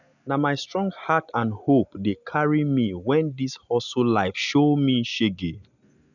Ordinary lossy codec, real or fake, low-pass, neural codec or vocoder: none; real; 7.2 kHz; none